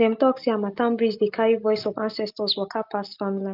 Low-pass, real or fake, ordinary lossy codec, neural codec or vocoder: 5.4 kHz; real; Opus, 24 kbps; none